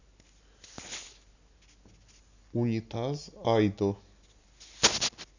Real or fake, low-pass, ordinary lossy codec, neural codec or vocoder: real; 7.2 kHz; none; none